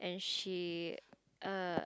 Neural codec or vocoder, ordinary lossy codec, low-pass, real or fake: none; none; none; real